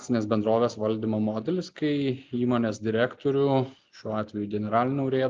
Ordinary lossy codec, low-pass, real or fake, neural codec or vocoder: Opus, 32 kbps; 7.2 kHz; real; none